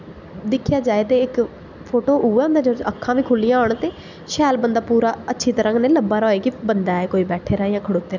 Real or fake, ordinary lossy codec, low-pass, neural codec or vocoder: real; none; 7.2 kHz; none